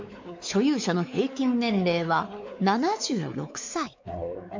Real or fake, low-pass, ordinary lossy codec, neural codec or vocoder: fake; 7.2 kHz; MP3, 64 kbps; codec, 16 kHz, 4 kbps, X-Codec, WavLM features, trained on Multilingual LibriSpeech